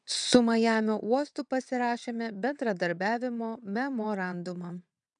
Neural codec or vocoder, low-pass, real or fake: vocoder, 22.05 kHz, 80 mel bands, WaveNeXt; 9.9 kHz; fake